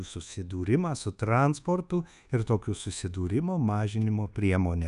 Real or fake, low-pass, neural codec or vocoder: fake; 10.8 kHz; codec, 24 kHz, 1.2 kbps, DualCodec